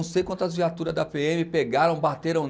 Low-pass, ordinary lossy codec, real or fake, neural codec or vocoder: none; none; real; none